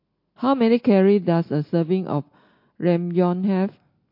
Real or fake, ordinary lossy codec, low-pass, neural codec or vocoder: real; MP3, 32 kbps; 5.4 kHz; none